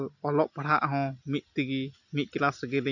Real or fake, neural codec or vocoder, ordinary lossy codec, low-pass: real; none; none; 7.2 kHz